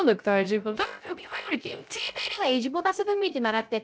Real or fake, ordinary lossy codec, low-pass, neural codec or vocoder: fake; none; none; codec, 16 kHz, 0.3 kbps, FocalCodec